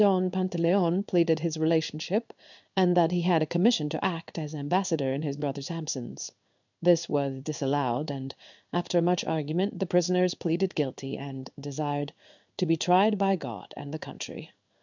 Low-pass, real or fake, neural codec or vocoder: 7.2 kHz; fake; codec, 16 kHz in and 24 kHz out, 1 kbps, XY-Tokenizer